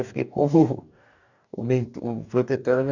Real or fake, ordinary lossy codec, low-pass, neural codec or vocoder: fake; none; 7.2 kHz; codec, 44.1 kHz, 2.6 kbps, DAC